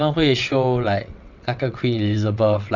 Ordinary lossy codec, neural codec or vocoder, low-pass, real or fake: none; vocoder, 22.05 kHz, 80 mel bands, WaveNeXt; 7.2 kHz; fake